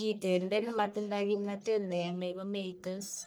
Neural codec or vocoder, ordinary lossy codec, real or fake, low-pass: codec, 44.1 kHz, 1.7 kbps, Pupu-Codec; none; fake; none